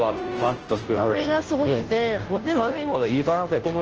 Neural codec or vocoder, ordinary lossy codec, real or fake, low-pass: codec, 16 kHz, 0.5 kbps, FunCodec, trained on Chinese and English, 25 frames a second; Opus, 16 kbps; fake; 7.2 kHz